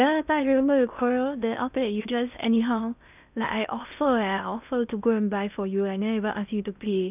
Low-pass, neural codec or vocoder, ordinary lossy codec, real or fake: 3.6 kHz; codec, 16 kHz in and 24 kHz out, 0.6 kbps, FocalCodec, streaming, 2048 codes; none; fake